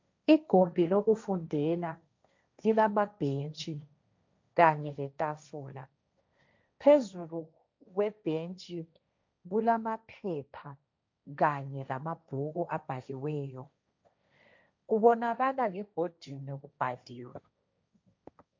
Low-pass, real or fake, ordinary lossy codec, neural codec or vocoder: 7.2 kHz; fake; MP3, 64 kbps; codec, 16 kHz, 1.1 kbps, Voila-Tokenizer